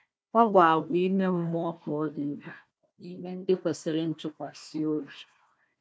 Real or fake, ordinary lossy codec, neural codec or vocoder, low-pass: fake; none; codec, 16 kHz, 1 kbps, FunCodec, trained on Chinese and English, 50 frames a second; none